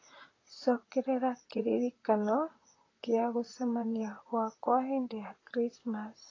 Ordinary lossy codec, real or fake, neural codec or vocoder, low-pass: AAC, 32 kbps; fake; vocoder, 44.1 kHz, 128 mel bands, Pupu-Vocoder; 7.2 kHz